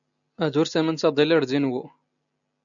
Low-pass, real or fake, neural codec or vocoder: 7.2 kHz; real; none